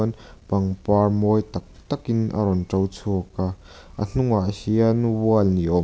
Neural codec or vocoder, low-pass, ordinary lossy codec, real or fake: none; none; none; real